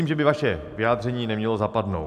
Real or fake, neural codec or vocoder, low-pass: real; none; 14.4 kHz